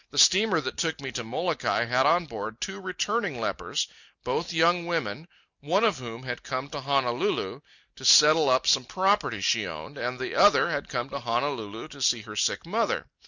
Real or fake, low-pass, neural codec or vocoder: real; 7.2 kHz; none